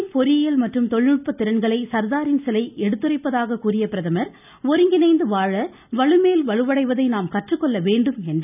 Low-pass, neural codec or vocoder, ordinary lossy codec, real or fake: 3.6 kHz; none; none; real